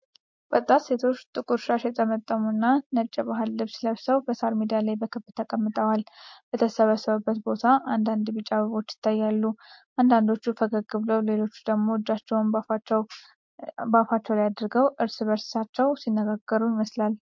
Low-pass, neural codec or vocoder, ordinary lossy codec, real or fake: 7.2 kHz; none; MP3, 48 kbps; real